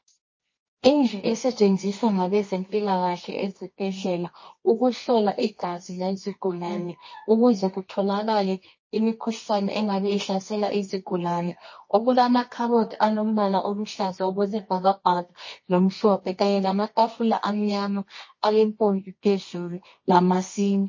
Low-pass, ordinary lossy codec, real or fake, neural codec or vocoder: 7.2 kHz; MP3, 32 kbps; fake; codec, 24 kHz, 0.9 kbps, WavTokenizer, medium music audio release